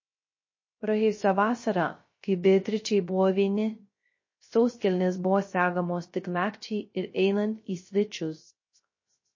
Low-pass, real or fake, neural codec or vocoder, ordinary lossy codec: 7.2 kHz; fake; codec, 16 kHz, 0.3 kbps, FocalCodec; MP3, 32 kbps